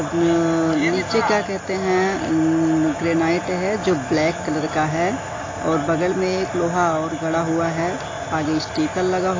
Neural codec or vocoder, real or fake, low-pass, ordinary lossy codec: none; real; 7.2 kHz; AAC, 32 kbps